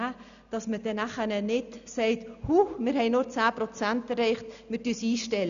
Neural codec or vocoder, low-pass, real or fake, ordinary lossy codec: none; 7.2 kHz; real; none